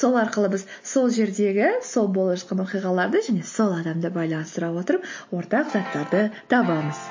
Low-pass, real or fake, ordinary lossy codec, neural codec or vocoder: 7.2 kHz; real; MP3, 32 kbps; none